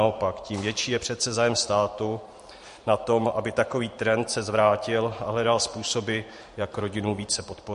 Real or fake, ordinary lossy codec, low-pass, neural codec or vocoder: fake; MP3, 48 kbps; 14.4 kHz; vocoder, 44.1 kHz, 128 mel bands every 512 samples, BigVGAN v2